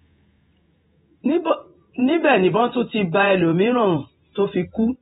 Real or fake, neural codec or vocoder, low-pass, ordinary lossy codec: fake; vocoder, 48 kHz, 128 mel bands, Vocos; 19.8 kHz; AAC, 16 kbps